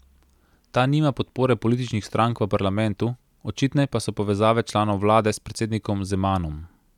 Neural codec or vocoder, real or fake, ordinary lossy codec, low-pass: none; real; none; 19.8 kHz